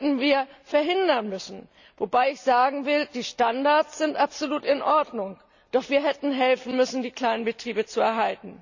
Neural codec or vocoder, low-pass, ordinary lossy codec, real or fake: none; 7.2 kHz; none; real